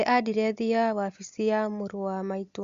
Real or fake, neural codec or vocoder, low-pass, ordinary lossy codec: real; none; 7.2 kHz; Opus, 64 kbps